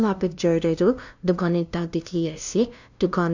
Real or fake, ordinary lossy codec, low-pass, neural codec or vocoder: fake; none; 7.2 kHz; codec, 16 kHz, 0.5 kbps, FunCodec, trained on LibriTTS, 25 frames a second